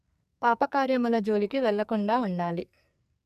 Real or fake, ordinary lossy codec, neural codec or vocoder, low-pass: fake; none; codec, 44.1 kHz, 2.6 kbps, SNAC; 14.4 kHz